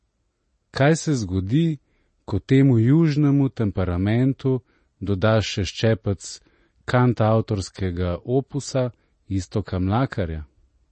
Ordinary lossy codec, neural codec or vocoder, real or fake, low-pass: MP3, 32 kbps; none; real; 10.8 kHz